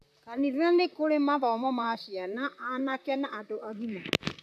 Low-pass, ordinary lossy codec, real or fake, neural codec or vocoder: 14.4 kHz; AAC, 96 kbps; fake; vocoder, 44.1 kHz, 128 mel bands, Pupu-Vocoder